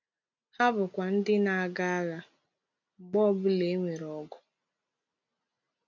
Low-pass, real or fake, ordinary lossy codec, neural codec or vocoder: 7.2 kHz; real; none; none